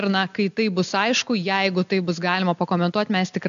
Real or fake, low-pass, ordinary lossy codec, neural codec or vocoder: real; 7.2 kHz; AAC, 96 kbps; none